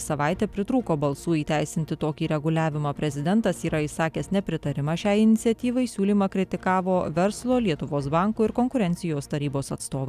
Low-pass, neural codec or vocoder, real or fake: 14.4 kHz; none; real